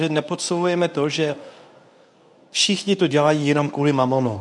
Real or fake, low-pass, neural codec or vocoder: fake; 10.8 kHz; codec, 24 kHz, 0.9 kbps, WavTokenizer, medium speech release version 1